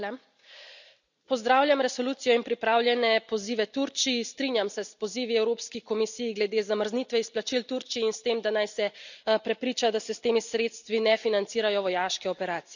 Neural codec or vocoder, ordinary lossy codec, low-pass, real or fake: none; none; 7.2 kHz; real